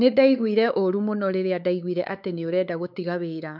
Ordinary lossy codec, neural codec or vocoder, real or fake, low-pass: none; codec, 16 kHz, 4 kbps, X-Codec, WavLM features, trained on Multilingual LibriSpeech; fake; 5.4 kHz